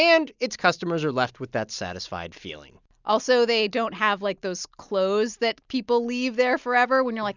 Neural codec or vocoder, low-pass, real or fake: none; 7.2 kHz; real